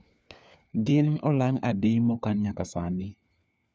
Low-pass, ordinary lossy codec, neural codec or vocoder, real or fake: none; none; codec, 16 kHz, 4 kbps, FunCodec, trained on LibriTTS, 50 frames a second; fake